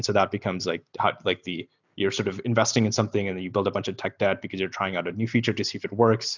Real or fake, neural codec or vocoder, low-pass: real; none; 7.2 kHz